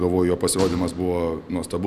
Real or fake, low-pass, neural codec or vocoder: real; 14.4 kHz; none